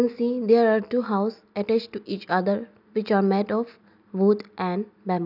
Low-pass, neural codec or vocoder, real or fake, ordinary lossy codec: 5.4 kHz; none; real; none